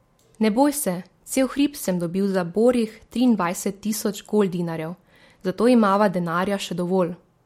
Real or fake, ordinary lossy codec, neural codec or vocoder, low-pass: real; MP3, 64 kbps; none; 19.8 kHz